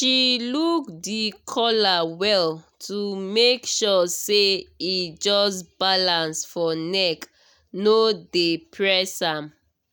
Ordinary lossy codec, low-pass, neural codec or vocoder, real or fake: none; none; none; real